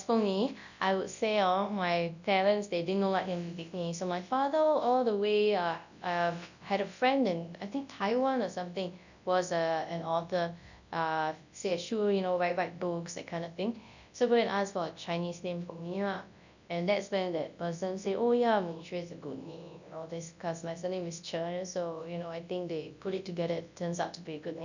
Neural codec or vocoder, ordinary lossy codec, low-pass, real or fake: codec, 24 kHz, 0.9 kbps, WavTokenizer, large speech release; none; 7.2 kHz; fake